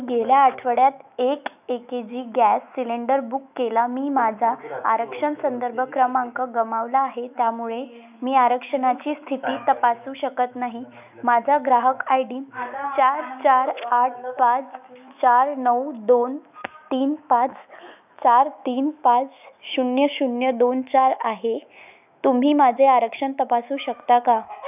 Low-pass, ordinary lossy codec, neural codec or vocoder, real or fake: 3.6 kHz; none; autoencoder, 48 kHz, 128 numbers a frame, DAC-VAE, trained on Japanese speech; fake